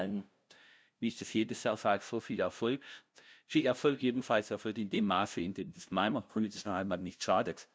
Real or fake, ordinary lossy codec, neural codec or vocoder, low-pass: fake; none; codec, 16 kHz, 0.5 kbps, FunCodec, trained on LibriTTS, 25 frames a second; none